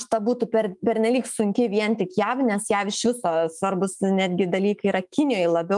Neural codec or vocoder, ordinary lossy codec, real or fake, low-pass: autoencoder, 48 kHz, 128 numbers a frame, DAC-VAE, trained on Japanese speech; Opus, 32 kbps; fake; 10.8 kHz